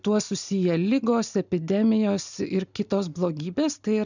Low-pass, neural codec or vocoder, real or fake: 7.2 kHz; none; real